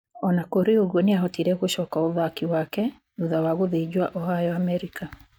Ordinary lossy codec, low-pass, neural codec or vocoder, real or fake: none; 19.8 kHz; none; real